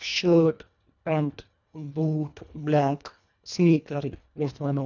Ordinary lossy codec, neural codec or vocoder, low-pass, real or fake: none; codec, 24 kHz, 1.5 kbps, HILCodec; 7.2 kHz; fake